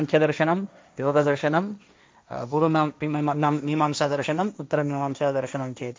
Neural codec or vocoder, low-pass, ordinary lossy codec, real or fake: codec, 16 kHz, 1.1 kbps, Voila-Tokenizer; none; none; fake